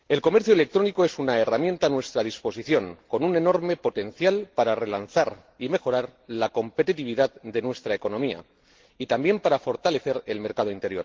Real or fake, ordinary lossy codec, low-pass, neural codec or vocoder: real; Opus, 16 kbps; 7.2 kHz; none